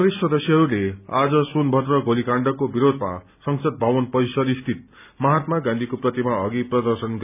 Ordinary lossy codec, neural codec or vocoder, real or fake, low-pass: none; none; real; 3.6 kHz